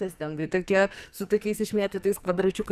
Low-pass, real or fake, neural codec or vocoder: 14.4 kHz; fake; codec, 44.1 kHz, 2.6 kbps, SNAC